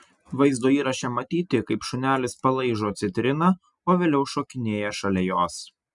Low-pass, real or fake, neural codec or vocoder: 10.8 kHz; real; none